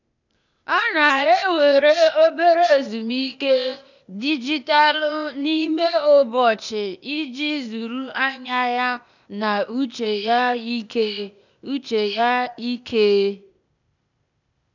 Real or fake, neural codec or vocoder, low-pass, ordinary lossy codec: fake; codec, 16 kHz, 0.8 kbps, ZipCodec; 7.2 kHz; none